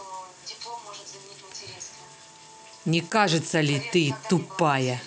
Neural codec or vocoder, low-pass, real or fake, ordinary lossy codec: none; none; real; none